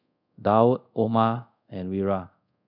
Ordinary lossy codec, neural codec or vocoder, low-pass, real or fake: none; codec, 24 kHz, 0.5 kbps, DualCodec; 5.4 kHz; fake